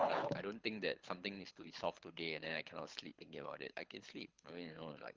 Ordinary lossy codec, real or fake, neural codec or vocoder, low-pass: Opus, 32 kbps; fake; codec, 24 kHz, 6 kbps, HILCodec; 7.2 kHz